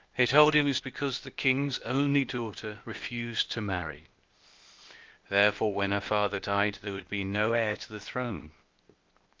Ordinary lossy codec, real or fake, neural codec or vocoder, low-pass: Opus, 24 kbps; fake; codec, 16 kHz, 0.8 kbps, ZipCodec; 7.2 kHz